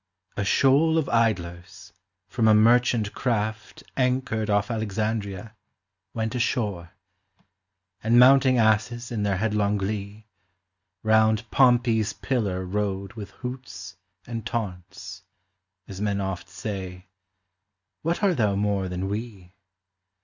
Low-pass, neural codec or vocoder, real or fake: 7.2 kHz; none; real